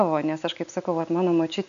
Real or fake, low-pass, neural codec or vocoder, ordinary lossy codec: real; 7.2 kHz; none; AAC, 96 kbps